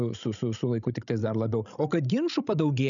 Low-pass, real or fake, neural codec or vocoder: 7.2 kHz; fake; codec, 16 kHz, 16 kbps, FreqCodec, larger model